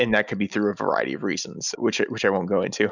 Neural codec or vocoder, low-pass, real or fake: none; 7.2 kHz; real